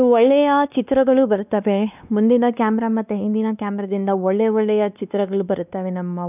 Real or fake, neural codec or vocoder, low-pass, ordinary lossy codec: fake; codec, 16 kHz, 2 kbps, X-Codec, WavLM features, trained on Multilingual LibriSpeech; 3.6 kHz; none